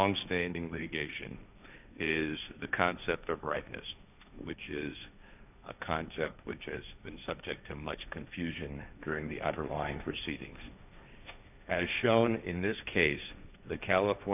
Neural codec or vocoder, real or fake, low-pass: codec, 16 kHz, 1.1 kbps, Voila-Tokenizer; fake; 3.6 kHz